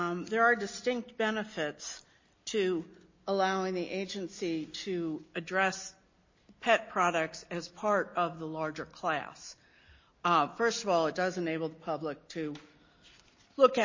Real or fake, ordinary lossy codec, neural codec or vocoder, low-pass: real; MP3, 32 kbps; none; 7.2 kHz